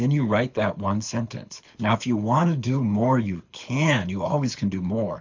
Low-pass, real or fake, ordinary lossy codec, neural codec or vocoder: 7.2 kHz; fake; MP3, 64 kbps; codec, 24 kHz, 6 kbps, HILCodec